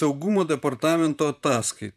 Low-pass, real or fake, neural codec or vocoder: 14.4 kHz; fake; vocoder, 44.1 kHz, 128 mel bands, Pupu-Vocoder